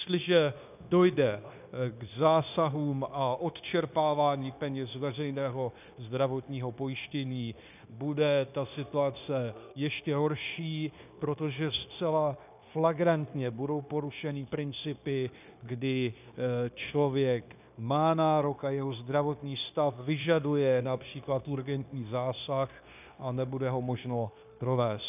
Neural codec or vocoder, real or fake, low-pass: codec, 16 kHz, 0.9 kbps, LongCat-Audio-Codec; fake; 3.6 kHz